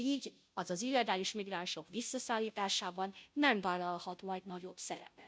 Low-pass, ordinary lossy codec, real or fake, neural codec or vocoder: none; none; fake; codec, 16 kHz, 0.5 kbps, FunCodec, trained on Chinese and English, 25 frames a second